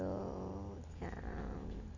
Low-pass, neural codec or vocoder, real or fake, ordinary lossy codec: 7.2 kHz; none; real; none